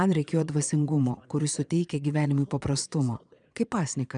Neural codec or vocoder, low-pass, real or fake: vocoder, 22.05 kHz, 80 mel bands, Vocos; 9.9 kHz; fake